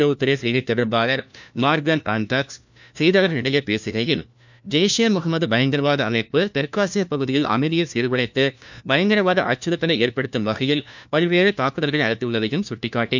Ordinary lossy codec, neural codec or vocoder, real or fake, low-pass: none; codec, 16 kHz, 1 kbps, FunCodec, trained on LibriTTS, 50 frames a second; fake; 7.2 kHz